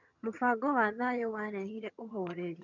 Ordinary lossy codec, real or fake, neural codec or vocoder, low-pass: none; fake; vocoder, 22.05 kHz, 80 mel bands, HiFi-GAN; 7.2 kHz